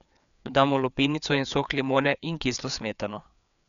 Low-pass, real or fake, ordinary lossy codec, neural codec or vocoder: 7.2 kHz; fake; none; codec, 16 kHz, 4 kbps, FreqCodec, larger model